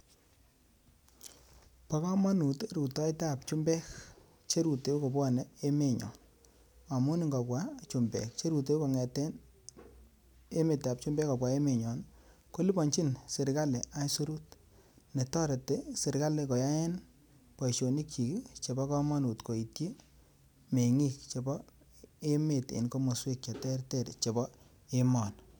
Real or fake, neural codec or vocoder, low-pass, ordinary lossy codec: real; none; none; none